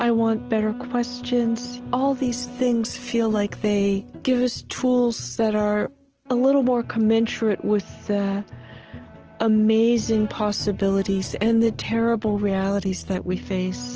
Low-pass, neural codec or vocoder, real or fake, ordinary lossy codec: 7.2 kHz; none; real; Opus, 16 kbps